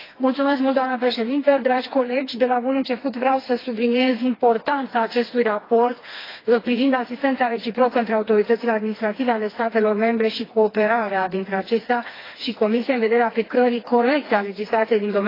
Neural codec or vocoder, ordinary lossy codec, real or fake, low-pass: codec, 16 kHz, 2 kbps, FreqCodec, smaller model; AAC, 24 kbps; fake; 5.4 kHz